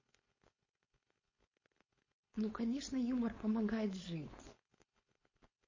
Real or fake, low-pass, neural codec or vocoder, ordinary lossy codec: fake; 7.2 kHz; codec, 16 kHz, 4.8 kbps, FACodec; MP3, 32 kbps